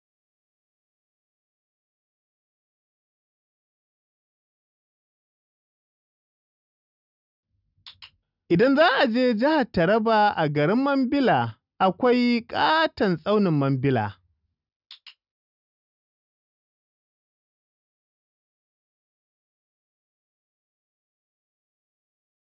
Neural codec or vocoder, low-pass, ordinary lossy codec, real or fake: none; 5.4 kHz; none; real